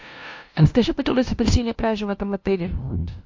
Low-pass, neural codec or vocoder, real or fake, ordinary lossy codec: 7.2 kHz; codec, 16 kHz, 0.5 kbps, FunCodec, trained on LibriTTS, 25 frames a second; fake; MP3, 48 kbps